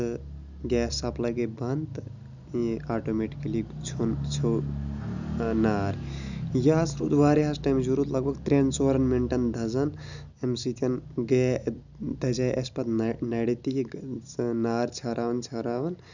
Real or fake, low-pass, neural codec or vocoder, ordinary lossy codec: real; 7.2 kHz; none; none